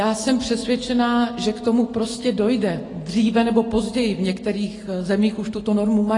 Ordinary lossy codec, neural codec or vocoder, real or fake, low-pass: AAC, 32 kbps; none; real; 10.8 kHz